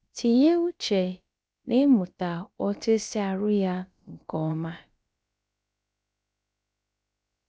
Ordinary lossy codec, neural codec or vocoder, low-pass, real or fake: none; codec, 16 kHz, about 1 kbps, DyCAST, with the encoder's durations; none; fake